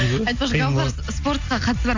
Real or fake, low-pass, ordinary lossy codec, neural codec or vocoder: real; 7.2 kHz; AAC, 48 kbps; none